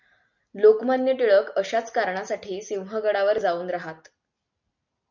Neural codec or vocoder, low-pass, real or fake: none; 7.2 kHz; real